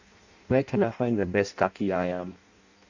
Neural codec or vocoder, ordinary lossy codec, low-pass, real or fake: codec, 16 kHz in and 24 kHz out, 0.6 kbps, FireRedTTS-2 codec; none; 7.2 kHz; fake